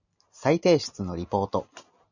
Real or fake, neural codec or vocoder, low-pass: real; none; 7.2 kHz